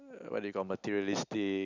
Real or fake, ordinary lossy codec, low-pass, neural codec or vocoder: real; none; 7.2 kHz; none